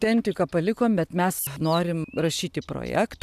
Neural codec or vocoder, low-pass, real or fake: none; 14.4 kHz; real